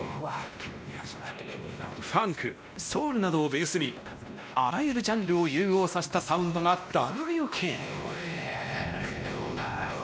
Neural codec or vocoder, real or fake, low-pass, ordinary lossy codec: codec, 16 kHz, 1 kbps, X-Codec, WavLM features, trained on Multilingual LibriSpeech; fake; none; none